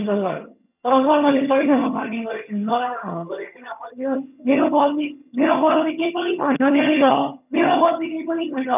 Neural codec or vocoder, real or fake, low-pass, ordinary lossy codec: vocoder, 22.05 kHz, 80 mel bands, HiFi-GAN; fake; 3.6 kHz; none